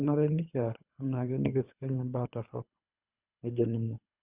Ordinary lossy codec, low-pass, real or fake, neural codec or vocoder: Opus, 64 kbps; 3.6 kHz; fake; codec, 24 kHz, 3 kbps, HILCodec